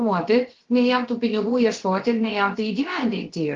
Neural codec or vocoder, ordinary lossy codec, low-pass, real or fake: codec, 16 kHz, 0.7 kbps, FocalCodec; Opus, 16 kbps; 7.2 kHz; fake